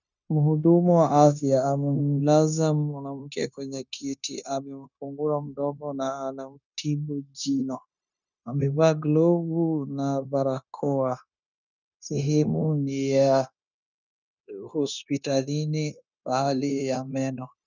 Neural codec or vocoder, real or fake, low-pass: codec, 16 kHz, 0.9 kbps, LongCat-Audio-Codec; fake; 7.2 kHz